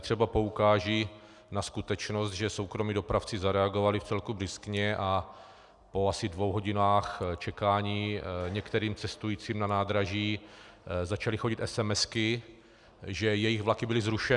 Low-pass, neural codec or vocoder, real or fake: 10.8 kHz; none; real